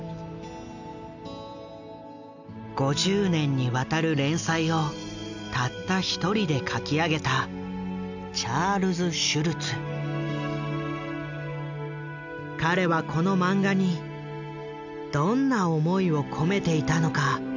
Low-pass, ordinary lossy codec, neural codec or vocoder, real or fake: 7.2 kHz; none; none; real